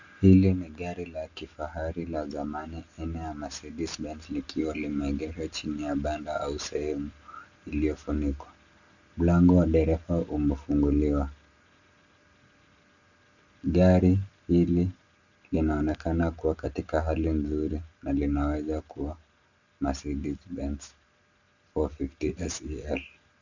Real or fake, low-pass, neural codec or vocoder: real; 7.2 kHz; none